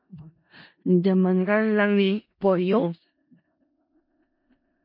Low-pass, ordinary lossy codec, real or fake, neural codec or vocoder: 5.4 kHz; MP3, 32 kbps; fake; codec, 16 kHz in and 24 kHz out, 0.4 kbps, LongCat-Audio-Codec, four codebook decoder